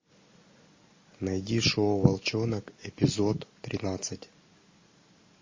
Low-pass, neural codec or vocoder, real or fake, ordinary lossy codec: 7.2 kHz; none; real; MP3, 32 kbps